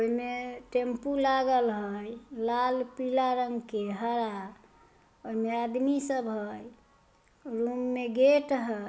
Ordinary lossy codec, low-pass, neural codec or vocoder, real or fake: none; none; none; real